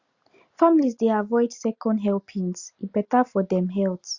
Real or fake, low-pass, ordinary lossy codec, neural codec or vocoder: real; 7.2 kHz; Opus, 64 kbps; none